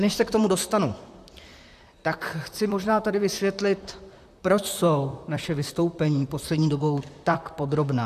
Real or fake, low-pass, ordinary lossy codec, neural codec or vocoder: fake; 14.4 kHz; AAC, 96 kbps; vocoder, 44.1 kHz, 128 mel bands, Pupu-Vocoder